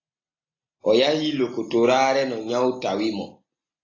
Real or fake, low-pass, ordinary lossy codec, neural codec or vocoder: real; 7.2 kHz; AAC, 32 kbps; none